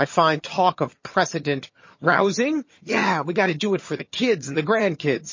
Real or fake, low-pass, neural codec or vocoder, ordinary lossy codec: fake; 7.2 kHz; vocoder, 22.05 kHz, 80 mel bands, HiFi-GAN; MP3, 32 kbps